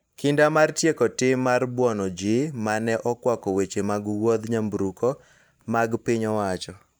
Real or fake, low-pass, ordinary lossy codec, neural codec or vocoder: fake; none; none; vocoder, 44.1 kHz, 128 mel bands every 512 samples, BigVGAN v2